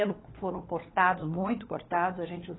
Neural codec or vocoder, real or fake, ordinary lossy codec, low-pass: codec, 16 kHz, 4 kbps, FunCodec, trained on LibriTTS, 50 frames a second; fake; AAC, 16 kbps; 7.2 kHz